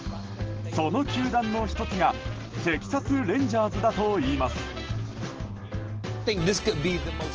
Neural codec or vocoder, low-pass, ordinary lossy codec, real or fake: none; 7.2 kHz; Opus, 16 kbps; real